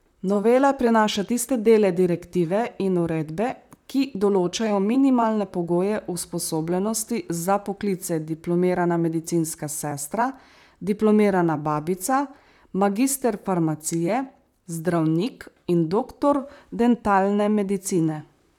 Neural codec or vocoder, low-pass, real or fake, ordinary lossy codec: vocoder, 44.1 kHz, 128 mel bands, Pupu-Vocoder; 19.8 kHz; fake; none